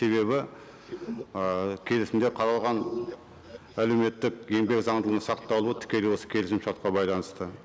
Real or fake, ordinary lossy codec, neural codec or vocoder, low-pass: real; none; none; none